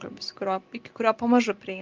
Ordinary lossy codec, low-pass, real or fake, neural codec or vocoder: Opus, 24 kbps; 7.2 kHz; real; none